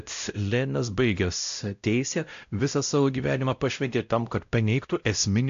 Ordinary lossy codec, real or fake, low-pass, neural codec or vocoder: AAC, 64 kbps; fake; 7.2 kHz; codec, 16 kHz, 0.5 kbps, X-Codec, WavLM features, trained on Multilingual LibriSpeech